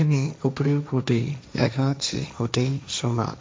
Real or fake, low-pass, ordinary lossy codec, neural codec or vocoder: fake; none; none; codec, 16 kHz, 1.1 kbps, Voila-Tokenizer